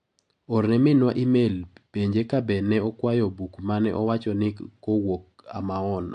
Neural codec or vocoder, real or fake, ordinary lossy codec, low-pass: none; real; AAC, 64 kbps; 9.9 kHz